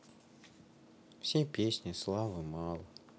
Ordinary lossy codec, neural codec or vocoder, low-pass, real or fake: none; none; none; real